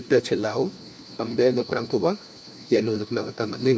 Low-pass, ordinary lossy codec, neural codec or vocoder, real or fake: none; none; codec, 16 kHz, 1 kbps, FunCodec, trained on LibriTTS, 50 frames a second; fake